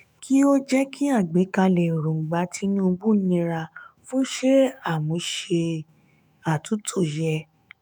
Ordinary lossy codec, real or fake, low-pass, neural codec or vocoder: none; fake; none; autoencoder, 48 kHz, 128 numbers a frame, DAC-VAE, trained on Japanese speech